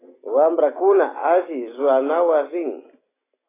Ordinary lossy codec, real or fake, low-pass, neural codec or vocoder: AAC, 16 kbps; real; 3.6 kHz; none